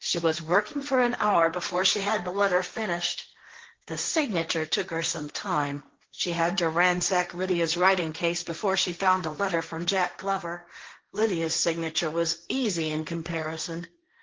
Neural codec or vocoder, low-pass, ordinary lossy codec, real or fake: codec, 16 kHz, 1.1 kbps, Voila-Tokenizer; 7.2 kHz; Opus, 16 kbps; fake